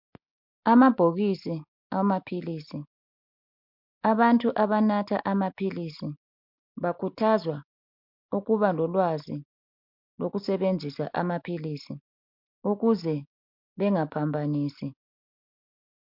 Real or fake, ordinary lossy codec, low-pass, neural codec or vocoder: real; MP3, 48 kbps; 5.4 kHz; none